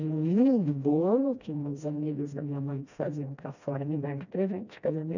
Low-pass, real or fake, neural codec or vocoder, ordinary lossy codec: 7.2 kHz; fake; codec, 16 kHz, 1 kbps, FreqCodec, smaller model; none